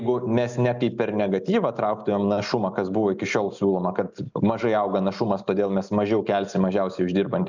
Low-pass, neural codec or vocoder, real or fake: 7.2 kHz; none; real